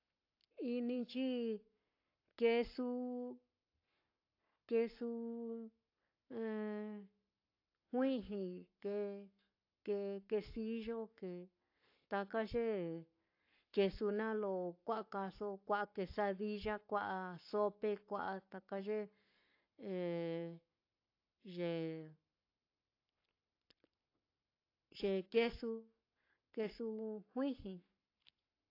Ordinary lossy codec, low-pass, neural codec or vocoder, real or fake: AAC, 32 kbps; 5.4 kHz; codec, 44.1 kHz, 7.8 kbps, Pupu-Codec; fake